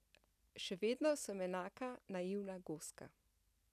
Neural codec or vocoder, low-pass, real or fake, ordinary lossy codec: none; 14.4 kHz; real; none